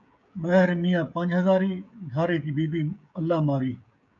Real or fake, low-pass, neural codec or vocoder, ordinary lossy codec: fake; 7.2 kHz; codec, 16 kHz, 16 kbps, FreqCodec, smaller model; MP3, 96 kbps